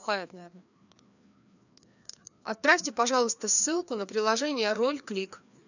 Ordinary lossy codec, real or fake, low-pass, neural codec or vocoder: none; fake; 7.2 kHz; codec, 16 kHz, 2 kbps, FreqCodec, larger model